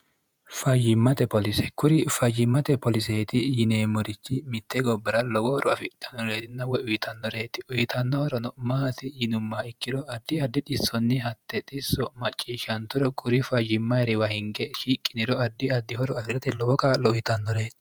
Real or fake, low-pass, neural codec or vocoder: fake; 19.8 kHz; vocoder, 48 kHz, 128 mel bands, Vocos